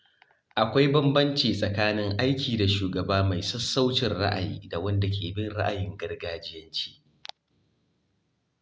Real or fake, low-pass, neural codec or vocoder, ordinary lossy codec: real; none; none; none